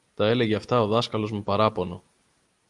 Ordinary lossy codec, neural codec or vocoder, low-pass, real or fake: Opus, 32 kbps; none; 10.8 kHz; real